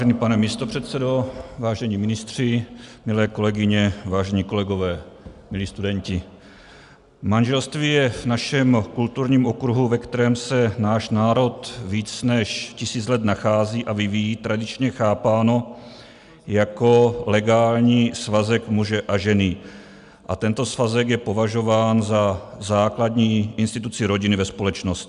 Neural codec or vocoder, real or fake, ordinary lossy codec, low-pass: none; real; MP3, 96 kbps; 10.8 kHz